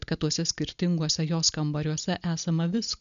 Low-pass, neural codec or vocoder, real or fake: 7.2 kHz; none; real